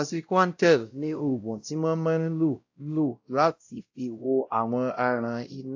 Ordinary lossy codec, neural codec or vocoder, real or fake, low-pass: AAC, 48 kbps; codec, 16 kHz, 0.5 kbps, X-Codec, WavLM features, trained on Multilingual LibriSpeech; fake; 7.2 kHz